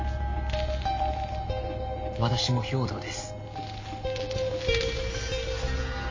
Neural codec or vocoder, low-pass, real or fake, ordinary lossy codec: none; 7.2 kHz; real; MP3, 32 kbps